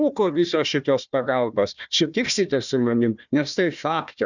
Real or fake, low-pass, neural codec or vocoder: fake; 7.2 kHz; codec, 16 kHz, 1 kbps, FunCodec, trained on Chinese and English, 50 frames a second